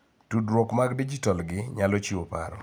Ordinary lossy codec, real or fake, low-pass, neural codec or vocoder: none; real; none; none